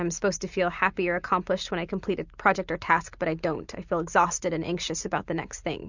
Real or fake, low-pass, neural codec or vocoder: real; 7.2 kHz; none